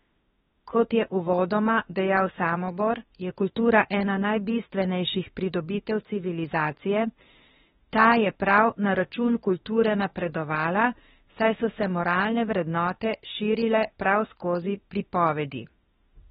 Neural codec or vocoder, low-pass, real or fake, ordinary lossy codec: autoencoder, 48 kHz, 32 numbers a frame, DAC-VAE, trained on Japanese speech; 19.8 kHz; fake; AAC, 16 kbps